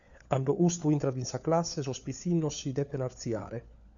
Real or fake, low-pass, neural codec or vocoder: fake; 7.2 kHz; codec, 16 kHz, 4 kbps, FunCodec, trained on LibriTTS, 50 frames a second